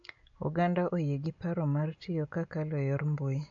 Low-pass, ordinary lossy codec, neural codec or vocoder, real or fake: 7.2 kHz; AAC, 64 kbps; none; real